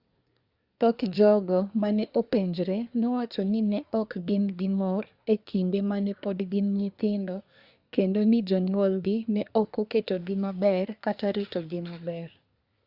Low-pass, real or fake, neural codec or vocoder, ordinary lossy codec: 5.4 kHz; fake; codec, 24 kHz, 1 kbps, SNAC; Opus, 64 kbps